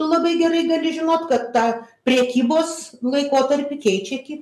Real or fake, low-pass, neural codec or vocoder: real; 14.4 kHz; none